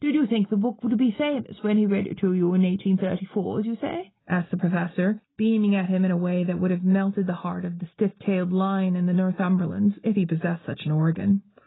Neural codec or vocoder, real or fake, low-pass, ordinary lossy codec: none; real; 7.2 kHz; AAC, 16 kbps